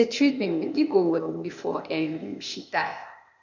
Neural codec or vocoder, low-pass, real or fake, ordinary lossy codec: codec, 16 kHz, 0.8 kbps, ZipCodec; 7.2 kHz; fake; none